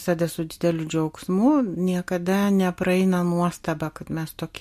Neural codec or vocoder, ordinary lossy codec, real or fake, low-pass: none; MP3, 64 kbps; real; 14.4 kHz